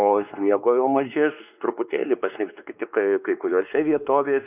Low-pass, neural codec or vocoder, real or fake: 3.6 kHz; codec, 16 kHz, 2 kbps, X-Codec, WavLM features, trained on Multilingual LibriSpeech; fake